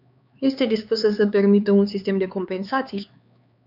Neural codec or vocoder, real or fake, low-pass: codec, 16 kHz, 4 kbps, X-Codec, HuBERT features, trained on LibriSpeech; fake; 5.4 kHz